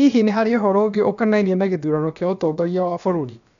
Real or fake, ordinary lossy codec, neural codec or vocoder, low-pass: fake; none; codec, 16 kHz, about 1 kbps, DyCAST, with the encoder's durations; 7.2 kHz